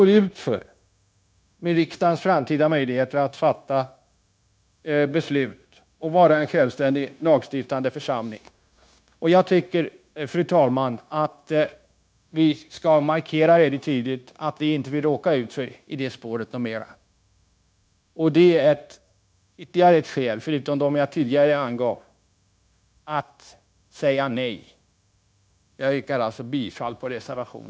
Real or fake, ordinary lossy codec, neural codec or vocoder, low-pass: fake; none; codec, 16 kHz, 0.9 kbps, LongCat-Audio-Codec; none